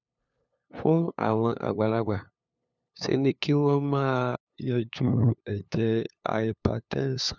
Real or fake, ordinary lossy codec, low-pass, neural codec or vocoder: fake; none; 7.2 kHz; codec, 16 kHz, 2 kbps, FunCodec, trained on LibriTTS, 25 frames a second